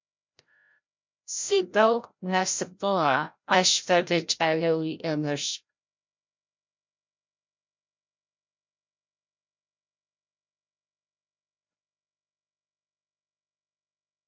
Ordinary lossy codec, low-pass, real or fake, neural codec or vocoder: MP3, 64 kbps; 7.2 kHz; fake; codec, 16 kHz, 0.5 kbps, FreqCodec, larger model